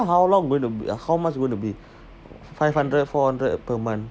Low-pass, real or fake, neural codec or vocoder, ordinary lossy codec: none; real; none; none